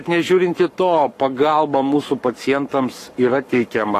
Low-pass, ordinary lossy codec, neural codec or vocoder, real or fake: 14.4 kHz; AAC, 48 kbps; codec, 44.1 kHz, 7.8 kbps, Pupu-Codec; fake